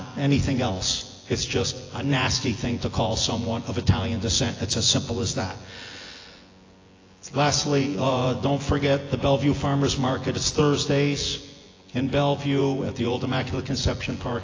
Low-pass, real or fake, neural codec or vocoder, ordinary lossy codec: 7.2 kHz; fake; vocoder, 24 kHz, 100 mel bands, Vocos; AAC, 32 kbps